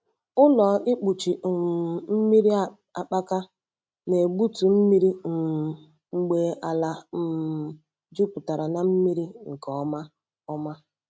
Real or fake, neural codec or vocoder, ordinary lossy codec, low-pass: real; none; none; none